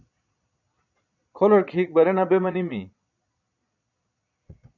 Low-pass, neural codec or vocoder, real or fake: 7.2 kHz; vocoder, 22.05 kHz, 80 mel bands, Vocos; fake